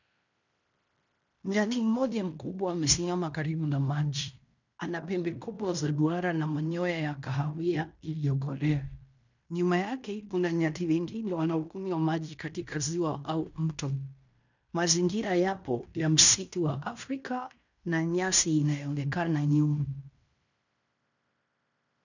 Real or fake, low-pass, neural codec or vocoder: fake; 7.2 kHz; codec, 16 kHz in and 24 kHz out, 0.9 kbps, LongCat-Audio-Codec, fine tuned four codebook decoder